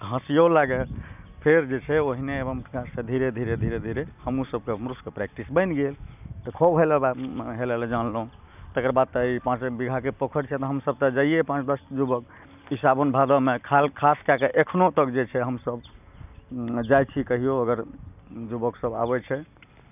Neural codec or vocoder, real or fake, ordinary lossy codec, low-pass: none; real; none; 3.6 kHz